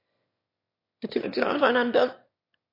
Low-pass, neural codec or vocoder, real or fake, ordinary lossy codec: 5.4 kHz; autoencoder, 22.05 kHz, a latent of 192 numbers a frame, VITS, trained on one speaker; fake; MP3, 32 kbps